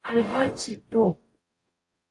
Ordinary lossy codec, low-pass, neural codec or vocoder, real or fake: AAC, 48 kbps; 10.8 kHz; codec, 44.1 kHz, 0.9 kbps, DAC; fake